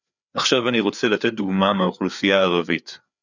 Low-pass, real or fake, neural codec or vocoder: 7.2 kHz; fake; codec, 16 kHz, 4 kbps, FreqCodec, larger model